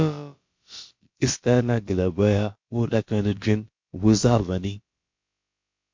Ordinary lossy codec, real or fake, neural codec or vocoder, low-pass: MP3, 48 kbps; fake; codec, 16 kHz, about 1 kbps, DyCAST, with the encoder's durations; 7.2 kHz